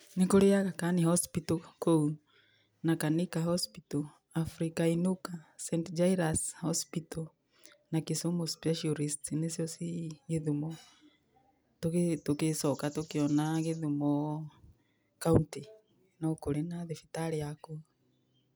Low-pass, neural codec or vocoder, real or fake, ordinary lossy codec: none; none; real; none